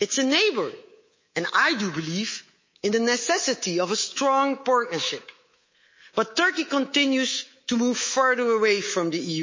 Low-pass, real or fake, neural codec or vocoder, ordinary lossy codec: 7.2 kHz; fake; codec, 24 kHz, 3.1 kbps, DualCodec; MP3, 32 kbps